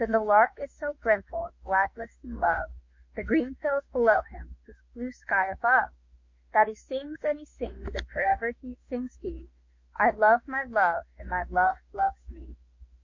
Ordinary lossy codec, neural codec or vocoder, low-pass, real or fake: MP3, 48 kbps; autoencoder, 48 kHz, 32 numbers a frame, DAC-VAE, trained on Japanese speech; 7.2 kHz; fake